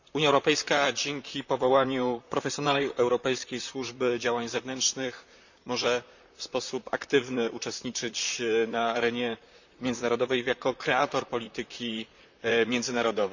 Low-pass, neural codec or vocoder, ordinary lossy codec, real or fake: 7.2 kHz; vocoder, 44.1 kHz, 128 mel bands, Pupu-Vocoder; none; fake